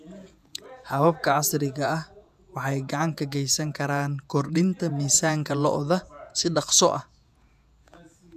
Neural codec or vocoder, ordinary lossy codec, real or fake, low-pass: vocoder, 48 kHz, 128 mel bands, Vocos; none; fake; 14.4 kHz